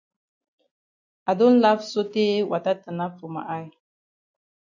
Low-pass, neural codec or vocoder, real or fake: 7.2 kHz; none; real